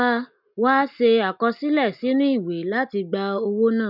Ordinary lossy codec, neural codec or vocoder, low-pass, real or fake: none; none; 5.4 kHz; real